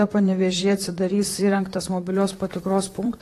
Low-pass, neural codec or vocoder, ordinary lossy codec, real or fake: 14.4 kHz; vocoder, 44.1 kHz, 128 mel bands, Pupu-Vocoder; AAC, 48 kbps; fake